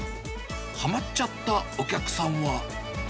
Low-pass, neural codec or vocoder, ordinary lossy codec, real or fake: none; none; none; real